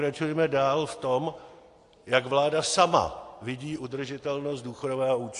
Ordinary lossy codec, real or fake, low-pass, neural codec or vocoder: AAC, 48 kbps; real; 10.8 kHz; none